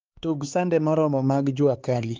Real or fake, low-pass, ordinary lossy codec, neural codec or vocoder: fake; 7.2 kHz; Opus, 32 kbps; codec, 16 kHz, 4 kbps, X-Codec, HuBERT features, trained on LibriSpeech